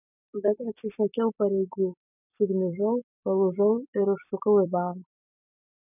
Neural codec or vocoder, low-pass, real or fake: vocoder, 44.1 kHz, 128 mel bands every 256 samples, BigVGAN v2; 3.6 kHz; fake